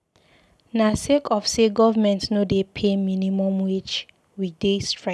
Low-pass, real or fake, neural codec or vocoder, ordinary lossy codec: none; real; none; none